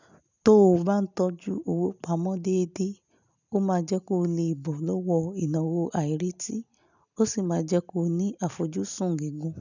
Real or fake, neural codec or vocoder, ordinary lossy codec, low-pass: real; none; none; 7.2 kHz